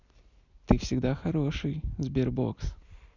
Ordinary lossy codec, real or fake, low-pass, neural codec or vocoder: none; real; 7.2 kHz; none